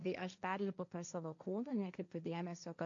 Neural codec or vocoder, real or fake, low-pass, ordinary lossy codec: codec, 16 kHz, 1.1 kbps, Voila-Tokenizer; fake; 7.2 kHz; AAC, 64 kbps